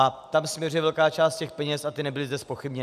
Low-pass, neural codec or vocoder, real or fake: 14.4 kHz; none; real